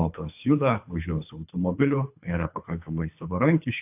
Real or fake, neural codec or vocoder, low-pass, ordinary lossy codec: fake; codec, 24 kHz, 3 kbps, HILCodec; 3.6 kHz; AAC, 32 kbps